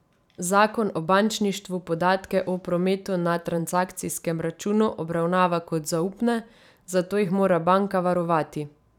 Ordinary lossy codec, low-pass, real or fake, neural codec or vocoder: none; 19.8 kHz; fake; vocoder, 44.1 kHz, 128 mel bands every 512 samples, BigVGAN v2